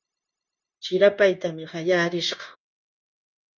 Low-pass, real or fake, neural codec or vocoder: 7.2 kHz; fake; codec, 16 kHz, 0.9 kbps, LongCat-Audio-Codec